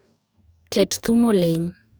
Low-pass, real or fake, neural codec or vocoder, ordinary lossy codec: none; fake; codec, 44.1 kHz, 2.6 kbps, DAC; none